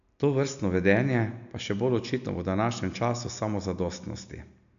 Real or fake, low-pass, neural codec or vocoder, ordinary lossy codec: real; 7.2 kHz; none; AAC, 96 kbps